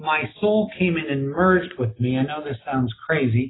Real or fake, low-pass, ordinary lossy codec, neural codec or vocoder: real; 7.2 kHz; AAC, 16 kbps; none